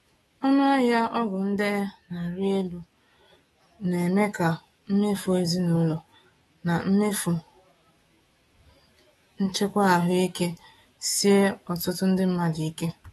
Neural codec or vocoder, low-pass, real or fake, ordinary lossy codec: autoencoder, 48 kHz, 128 numbers a frame, DAC-VAE, trained on Japanese speech; 19.8 kHz; fake; AAC, 32 kbps